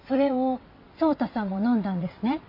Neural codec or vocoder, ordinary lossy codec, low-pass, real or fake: vocoder, 22.05 kHz, 80 mel bands, WaveNeXt; MP3, 32 kbps; 5.4 kHz; fake